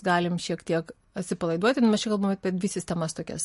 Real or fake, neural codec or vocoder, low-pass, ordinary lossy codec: real; none; 14.4 kHz; MP3, 48 kbps